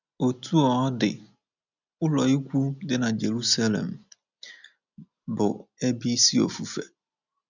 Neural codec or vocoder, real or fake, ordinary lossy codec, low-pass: none; real; none; 7.2 kHz